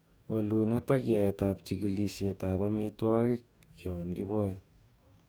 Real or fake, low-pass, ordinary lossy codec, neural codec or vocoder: fake; none; none; codec, 44.1 kHz, 2.6 kbps, DAC